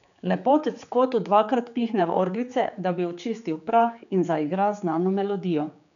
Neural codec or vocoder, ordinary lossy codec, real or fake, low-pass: codec, 16 kHz, 4 kbps, X-Codec, HuBERT features, trained on general audio; none; fake; 7.2 kHz